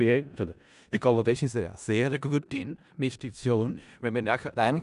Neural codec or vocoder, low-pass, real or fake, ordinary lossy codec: codec, 16 kHz in and 24 kHz out, 0.4 kbps, LongCat-Audio-Codec, four codebook decoder; 10.8 kHz; fake; none